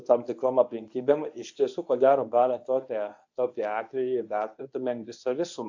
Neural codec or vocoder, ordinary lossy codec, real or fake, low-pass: codec, 24 kHz, 0.9 kbps, WavTokenizer, medium speech release version 1; AAC, 48 kbps; fake; 7.2 kHz